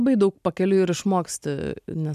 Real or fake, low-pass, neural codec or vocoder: real; 14.4 kHz; none